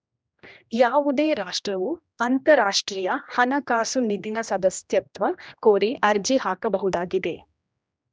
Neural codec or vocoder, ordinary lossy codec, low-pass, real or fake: codec, 16 kHz, 1 kbps, X-Codec, HuBERT features, trained on general audio; none; none; fake